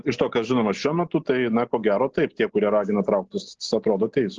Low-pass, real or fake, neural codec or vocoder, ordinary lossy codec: 7.2 kHz; real; none; Opus, 16 kbps